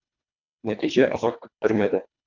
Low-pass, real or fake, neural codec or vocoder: 7.2 kHz; fake; codec, 24 kHz, 1.5 kbps, HILCodec